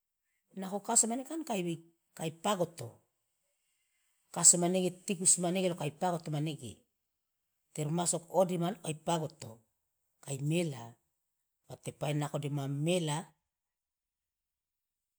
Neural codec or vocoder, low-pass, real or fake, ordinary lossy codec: none; none; real; none